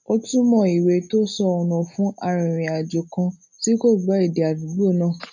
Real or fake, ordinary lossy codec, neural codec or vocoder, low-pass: real; none; none; 7.2 kHz